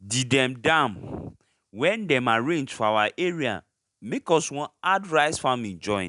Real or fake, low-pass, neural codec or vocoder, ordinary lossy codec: real; 10.8 kHz; none; none